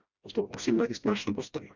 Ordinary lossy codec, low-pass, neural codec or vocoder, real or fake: Opus, 64 kbps; 7.2 kHz; codec, 16 kHz, 1 kbps, FreqCodec, smaller model; fake